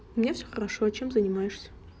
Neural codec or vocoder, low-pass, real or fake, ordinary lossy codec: none; none; real; none